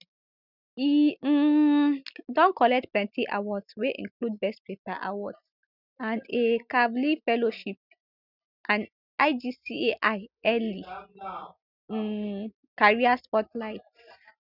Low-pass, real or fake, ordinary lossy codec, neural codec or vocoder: 5.4 kHz; real; none; none